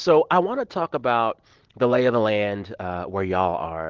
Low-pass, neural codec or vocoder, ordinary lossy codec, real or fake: 7.2 kHz; none; Opus, 16 kbps; real